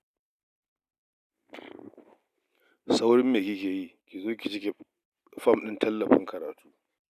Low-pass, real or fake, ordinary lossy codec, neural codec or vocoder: 14.4 kHz; real; none; none